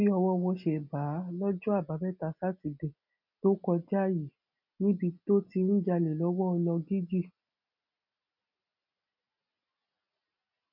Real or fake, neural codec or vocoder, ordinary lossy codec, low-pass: real; none; none; 5.4 kHz